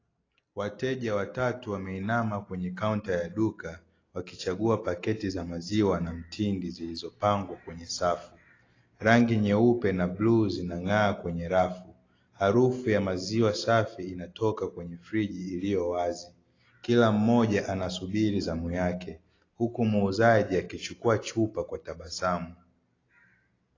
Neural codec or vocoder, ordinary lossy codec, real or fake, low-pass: none; AAC, 32 kbps; real; 7.2 kHz